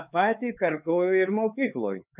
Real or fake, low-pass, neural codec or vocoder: fake; 3.6 kHz; codec, 16 kHz, 4 kbps, X-Codec, HuBERT features, trained on LibriSpeech